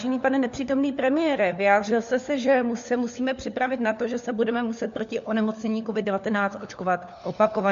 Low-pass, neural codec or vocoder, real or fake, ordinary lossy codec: 7.2 kHz; codec, 16 kHz, 4 kbps, FunCodec, trained on LibriTTS, 50 frames a second; fake; MP3, 48 kbps